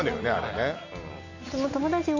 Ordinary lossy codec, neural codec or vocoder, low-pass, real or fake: none; none; 7.2 kHz; real